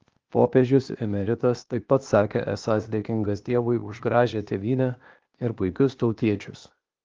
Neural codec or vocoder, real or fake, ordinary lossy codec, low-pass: codec, 16 kHz, 0.8 kbps, ZipCodec; fake; Opus, 24 kbps; 7.2 kHz